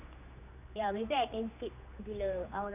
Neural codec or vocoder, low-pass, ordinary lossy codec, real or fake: codec, 16 kHz, 2 kbps, X-Codec, HuBERT features, trained on general audio; 3.6 kHz; none; fake